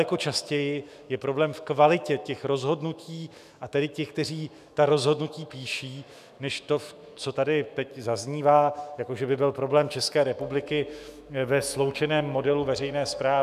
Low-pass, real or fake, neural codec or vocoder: 14.4 kHz; fake; autoencoder, 48 kHz, 128 numbers a frame, DAC-VAE, trained on Japanese speech